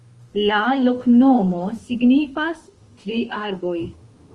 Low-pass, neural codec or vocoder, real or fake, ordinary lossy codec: 10.8 kHz; autoencoder, 48 kHz, 32 numbers a frame, DAC-VAE, trained on Japanese speech; fake; Opus, 24 kbps